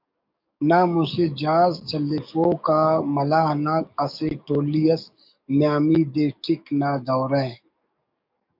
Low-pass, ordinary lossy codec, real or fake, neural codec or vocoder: 5.4 kHz; MP3, 48 kbps; fake; codec, 44.1 kHz, 7.8 kbps, DAC